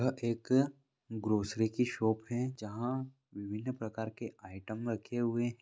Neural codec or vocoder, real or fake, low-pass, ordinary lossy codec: none; real; none; none